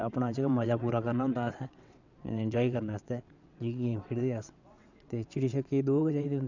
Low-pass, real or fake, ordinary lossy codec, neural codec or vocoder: 7.2 kHz; fake; none; vocoder, 22.05 kHz, 80 mel bands, WaveNeXt